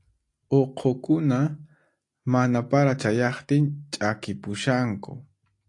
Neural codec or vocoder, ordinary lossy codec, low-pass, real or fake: none; AAC, 48 kbps; 10.8 kHz; real